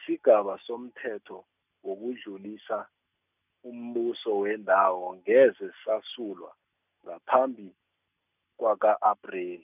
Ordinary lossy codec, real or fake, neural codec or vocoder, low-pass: none; real; none; 3.6 kHz